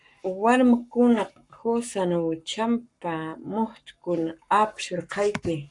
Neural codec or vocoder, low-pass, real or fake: codec, 44.1 kHz, 7.8 kbps, Pupu-Codec; 10.8 kHz; fake